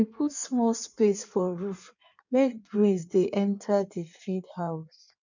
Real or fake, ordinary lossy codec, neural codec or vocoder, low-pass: fake; none; codec, 16 kHz in and 24 kHz out, 1.1 kbps, FireRedTTS-2 codec; 7.2 kHz